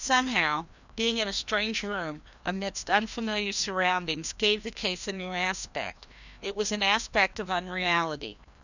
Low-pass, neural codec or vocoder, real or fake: 7.2 kHz; codec, 16 kHz, 1 kbps, FreqCodec, larger model; fake